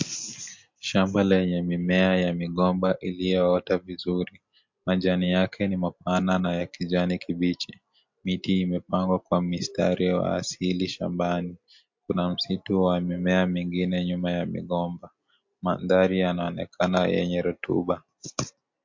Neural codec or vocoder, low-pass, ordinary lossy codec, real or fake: none; 7.2 kHz; MP3, 48 kbps; real